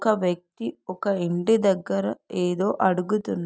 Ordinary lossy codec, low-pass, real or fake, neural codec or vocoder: none; none; real; none